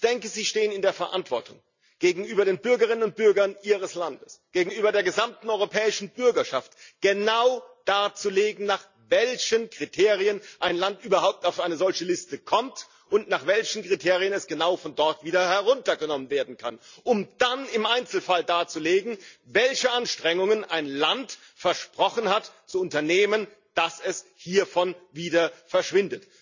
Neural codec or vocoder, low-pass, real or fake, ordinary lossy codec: none; 7.2 kHz; real; none